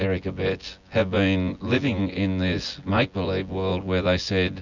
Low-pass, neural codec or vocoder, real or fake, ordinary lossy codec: 7.2 kHz; vocoder, 24 kHz, 100 mel bands, Vocos; fake; Opus, 64 kbps